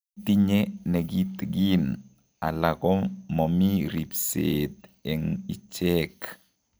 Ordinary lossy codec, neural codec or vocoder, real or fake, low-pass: none; none; real; none